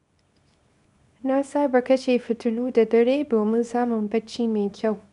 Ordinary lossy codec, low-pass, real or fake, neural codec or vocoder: none; 10.8 kHz; fake; codec, 24 kHz, 0.9 kbps, WavTokenizer, small release